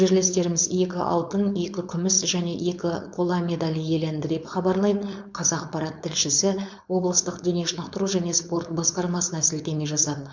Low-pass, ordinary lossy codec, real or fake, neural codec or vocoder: 7.2 kHz; MP3, 64 kbps; fake; codec, 16 kHz, 4.8 kbps, FACodec